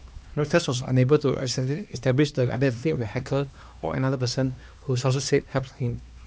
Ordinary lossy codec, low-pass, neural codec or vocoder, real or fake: none; none; codec, 16 kHz, 2 kbps, X-Codec, HuBERT features, trained on LibriSpeech; fake